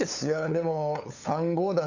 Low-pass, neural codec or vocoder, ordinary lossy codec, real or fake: 7.2 kHz; codec, 16 kHz, 8 kbps, FunCodec, trained on LibriTTS, 25 frames a second; none; fake